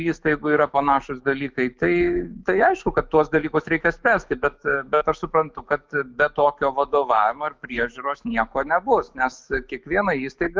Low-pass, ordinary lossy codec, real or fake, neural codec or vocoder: 7.2 kHz; Opus, 32 kbps; fake; autoencoder, 48 kHz, 128 numbers a frame, DAC-VAE, trained on Japanese speech